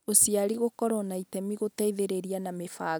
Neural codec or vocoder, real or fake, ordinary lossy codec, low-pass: none; real; none; none